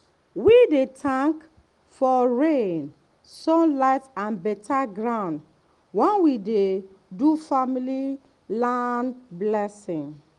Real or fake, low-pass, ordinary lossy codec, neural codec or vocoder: real; 10.8 kHz; Opus, 24 kbps; none